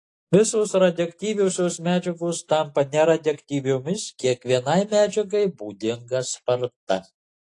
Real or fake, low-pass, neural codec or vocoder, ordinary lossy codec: real; 10.8 kHz; none; AAC, 48 kbps